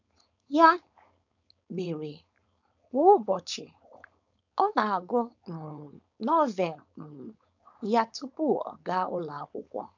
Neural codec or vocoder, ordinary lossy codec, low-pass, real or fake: codec, 16 kHz, 4.8 kbps, FACodec; none; 7.2 kHz; fake